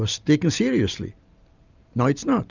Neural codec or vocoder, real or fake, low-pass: none; real; 7.2 kHz